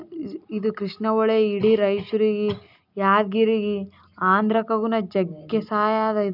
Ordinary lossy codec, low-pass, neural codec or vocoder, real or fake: none; 5.4 kHz; none; real